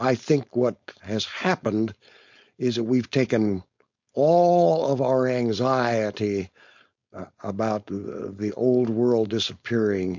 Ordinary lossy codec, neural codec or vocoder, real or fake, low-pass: MP3, 48 kbps; codec, 16 kHz, 4.8 kbps, FACodec; fake; 7.2 kHz